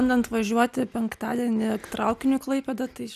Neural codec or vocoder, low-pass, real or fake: none; 14.4 kHz; real